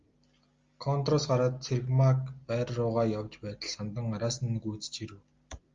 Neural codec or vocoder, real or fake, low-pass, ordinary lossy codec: none; real; 7.2 kHz; Opus, 32 kbps